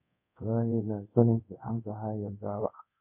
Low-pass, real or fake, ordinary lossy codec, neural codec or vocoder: 3.6 kHz; fake; MP3, 32 kbps; codec, 24 kHz, 0.5 kbps, DualCodec